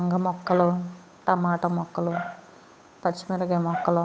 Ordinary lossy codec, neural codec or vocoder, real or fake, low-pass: none; codec, 16 kHz, 8 kbps, FunCodec, trained on Chinese and English, 25 frames a second; fake; none